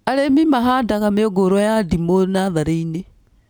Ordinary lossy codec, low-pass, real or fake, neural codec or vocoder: none; none; real; none